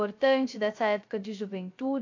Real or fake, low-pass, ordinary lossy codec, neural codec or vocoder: fake; 7.2 kHz; AAC, 48 kbps; codec, 16 kHz, 0.3 kbps, FocalCodec